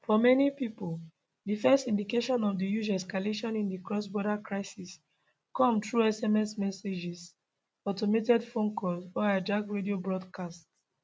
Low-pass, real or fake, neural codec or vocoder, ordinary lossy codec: none; real; none; none